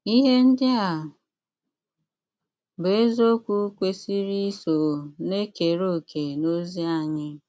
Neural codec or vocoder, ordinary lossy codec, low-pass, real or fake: none; none; none; real